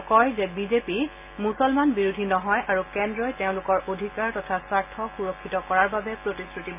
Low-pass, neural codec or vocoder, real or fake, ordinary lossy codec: 3.6 kHz; none; real; MP3, 24 kbps